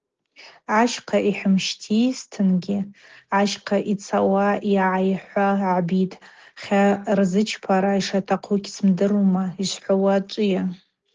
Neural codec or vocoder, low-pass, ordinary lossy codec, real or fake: none; 7.2 kHz; Opus, 16 kbps; real